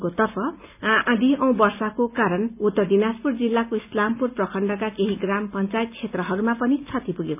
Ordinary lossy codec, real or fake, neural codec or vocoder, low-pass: none; real; none; 3.6 kHz